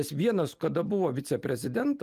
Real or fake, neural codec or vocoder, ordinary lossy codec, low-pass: real; none; Opus, 32 kbps; 14.4 kHz